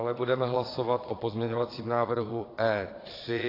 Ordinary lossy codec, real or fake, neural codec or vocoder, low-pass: AAC, 24 kbps; fake; vocoder, 22.05 kHz, 80 mel bands, Vocos; 5.4 kHz